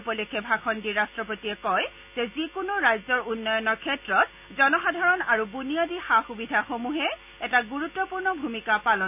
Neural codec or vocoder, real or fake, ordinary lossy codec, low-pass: none; real; none; 3.6 kHz